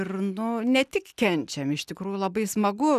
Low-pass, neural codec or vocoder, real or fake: 14.4 kHz; none; real